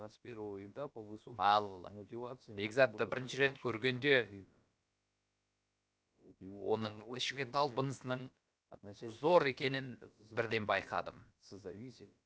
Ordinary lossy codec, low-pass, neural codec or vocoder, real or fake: none; none; codec, 16 kHz, about 1 kbps, DyCAST, with the encoder's durations; fake